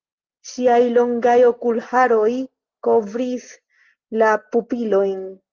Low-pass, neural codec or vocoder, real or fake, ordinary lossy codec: 7.2 kHz; none; real; Opus, 24 kbps